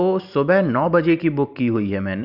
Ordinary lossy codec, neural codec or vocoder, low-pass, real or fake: none; vocoder, 44.1 kHz, 128 mel bands every 512 samples, BigVGAN v2; 5.4 kHz; fake